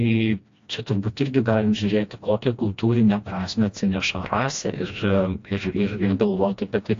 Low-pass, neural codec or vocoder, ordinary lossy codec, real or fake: 7.2 kHz; codec, 16 kHz, 1 kbps, FreqCodec, smaller model; AAC, 48 kbps; fake